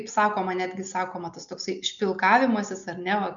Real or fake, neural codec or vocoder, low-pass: real; none; 7.2 kHz